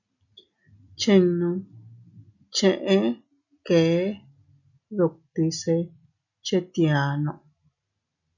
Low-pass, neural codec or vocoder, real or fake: 7.2 kHz; none; real